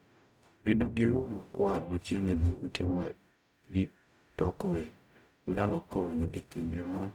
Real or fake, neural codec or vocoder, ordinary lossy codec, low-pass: fake; codec, 44.1 kHz, 0.9 kbps, DAC; none; 19.8 kHz